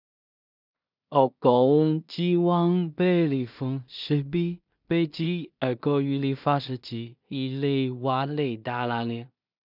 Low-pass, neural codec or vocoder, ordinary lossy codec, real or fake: 5.4 kHz; codec, 16 kHz in and 24 kHz out, 0.4 kbps, LongCat-Audio-Codec, two codebook decoder; none; fake